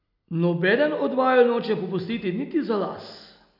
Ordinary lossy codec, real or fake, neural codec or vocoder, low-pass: none; real; none; 5.4 kHz